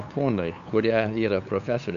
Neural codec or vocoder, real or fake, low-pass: codec, 16 kHz, 8 kbps, FunCodec, trained on LibriTTS, 25 frames a second; fake; 7.2 kHz